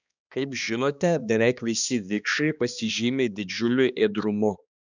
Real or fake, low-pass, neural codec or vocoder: fake; 7.2 kHz; codec, 16 kHz, 2 kbps, X-Codec, HuBERT features, trained on balanced general audio